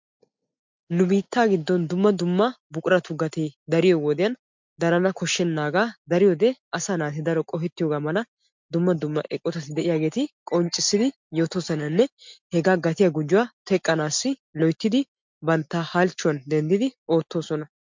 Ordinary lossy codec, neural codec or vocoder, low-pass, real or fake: MP3, 64 kbps; none; 7.2 kHz; real